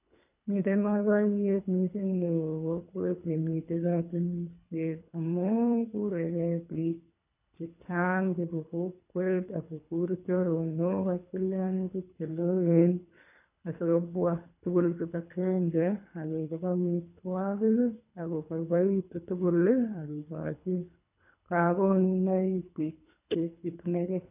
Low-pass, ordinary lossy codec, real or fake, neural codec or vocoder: 3.6 kHz; none; fake; codec, 24 kHz, 3 kbps, HILCodec